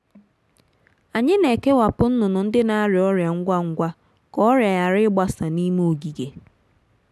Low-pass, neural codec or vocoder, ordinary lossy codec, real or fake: none; none; none; real